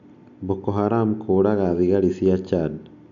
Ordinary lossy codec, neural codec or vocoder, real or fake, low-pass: none; none; real; 7.2 kHz